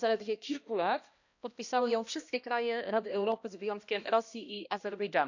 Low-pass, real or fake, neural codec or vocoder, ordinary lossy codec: 7.2 kHz; fake; codec, 16 kHz, 1 kbps, X-Codec, HuBERT features, trained on balanced general audio; none